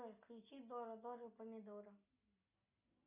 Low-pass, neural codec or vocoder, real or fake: 3.6 kHz; none; real